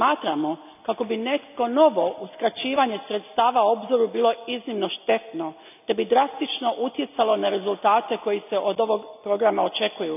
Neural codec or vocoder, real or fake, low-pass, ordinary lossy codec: none; real; 3.6 kHz; none